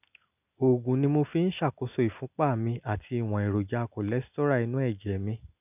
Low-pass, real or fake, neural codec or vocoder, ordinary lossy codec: 3.6 kHz; real; none; none